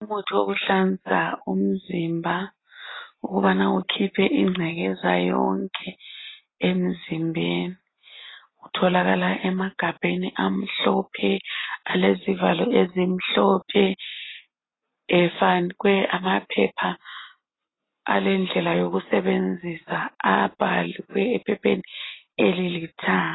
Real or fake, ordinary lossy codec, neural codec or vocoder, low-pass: real; AAC, 16 kbps; none; 7.2 kHz